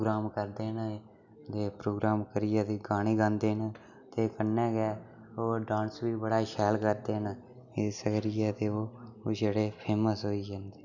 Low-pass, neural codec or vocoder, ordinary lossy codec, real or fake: 7.2 kHz; none; none; real